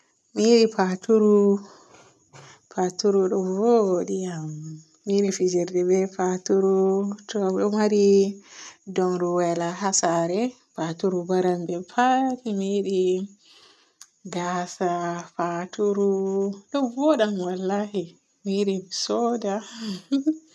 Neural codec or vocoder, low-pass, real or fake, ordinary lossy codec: none; none; real; none